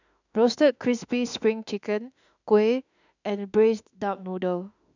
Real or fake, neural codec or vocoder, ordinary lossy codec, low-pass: fake; autoencoder, 48 kHz, 32 numbers a frame, DAC-VAE, trained on Japanese speech; none; 7.2 kHz